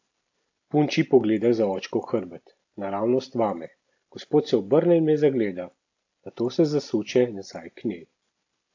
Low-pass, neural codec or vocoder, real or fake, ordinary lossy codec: 7.2 kHz; none; real; AAC, 48 kbps